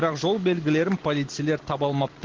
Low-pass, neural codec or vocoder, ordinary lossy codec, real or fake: 7.2 kHz; none; Opus, 16 kbps; real